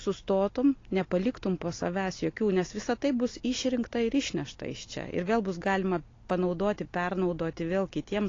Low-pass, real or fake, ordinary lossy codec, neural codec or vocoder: 7.2 kHz; real; AAC, 32 kbps; none